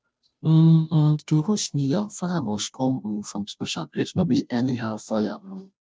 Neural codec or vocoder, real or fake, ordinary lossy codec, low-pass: codec, 16 kHz, 0.5 kbps, FunCodec, trained on Chinese and English, 25 frames a second; fake; none; none